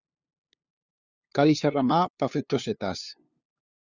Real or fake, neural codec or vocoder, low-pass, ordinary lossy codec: fake; codec, 16 kHz, 2 kbps, FunCodec, trained on LibriTTS, 25 frames a second; 7.2 kHz; Opus, 64 kbps